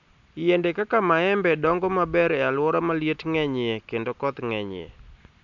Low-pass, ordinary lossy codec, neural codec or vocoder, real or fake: 7.2 kHz; MP3, 64 kbps; none; real